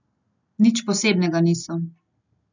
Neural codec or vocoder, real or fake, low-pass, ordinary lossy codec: none; real; 7.2 kHz; none